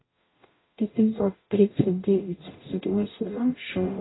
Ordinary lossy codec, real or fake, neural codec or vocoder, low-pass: AAC, 16 kbps; fake; codec, 44.1 kHz, 0.9 kbps, DAC; 7.2 kHz